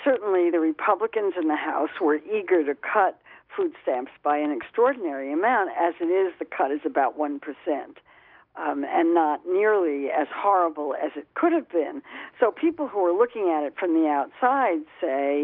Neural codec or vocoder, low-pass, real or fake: none; 5.4 kHz; real